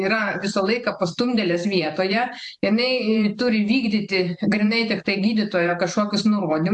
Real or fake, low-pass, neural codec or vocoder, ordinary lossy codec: fake; 10.8 kHz; vocoder, 44.1 kHz, 128 mel bands every 512 samples, BigVGAN v2; AAC, 64 kbps